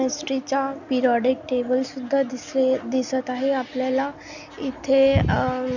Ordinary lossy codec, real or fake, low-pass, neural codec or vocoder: none; real; 7.2 kHz; none